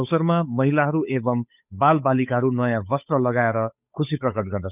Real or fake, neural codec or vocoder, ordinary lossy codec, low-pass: fake; codec, 16 kHz, 8 kbps, FunCodec, trained on Chinese and English, 25 frames a second; none; 3.6 kHz